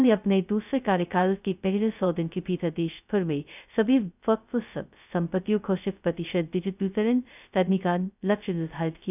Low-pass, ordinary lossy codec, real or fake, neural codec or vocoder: 3.6 kHz; none; fake; codec, 16 kHz, 0.2 kbps, FocalCodec